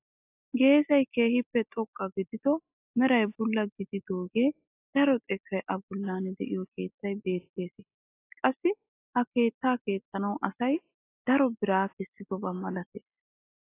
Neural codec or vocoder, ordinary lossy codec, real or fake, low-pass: none; AAC, 24 kbps; real; 3.6 kHz